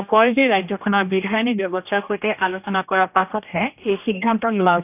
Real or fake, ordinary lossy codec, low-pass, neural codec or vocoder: fake; none; 3.6 kHz; codec, 16 kHz, 1 kbps, X-Codec, HuBERT features, trained on general audio